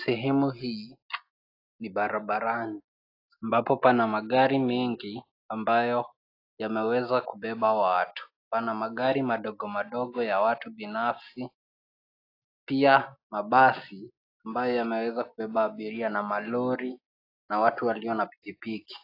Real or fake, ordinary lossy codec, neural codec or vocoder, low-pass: real; AAC, 32 kbps; none; 5.4 kHz